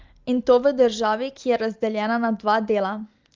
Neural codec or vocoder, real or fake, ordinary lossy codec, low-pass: none; real; Opus, 32 kbps; 7.2 kHz